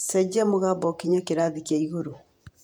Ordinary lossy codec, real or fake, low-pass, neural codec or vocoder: none; fake; 19.8 kHz; vocoder, 48 kHz, 128 mel bands, Vocos